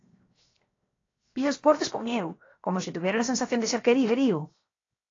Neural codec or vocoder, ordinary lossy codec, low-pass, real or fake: codec, 16 kHz, 0.7 kbps, FocalCodec; AAC, 32 kbps; 7.2 kHz; fake